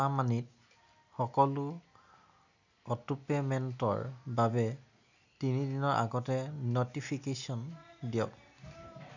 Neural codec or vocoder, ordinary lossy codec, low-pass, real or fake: none; none; 7.2 kHz; real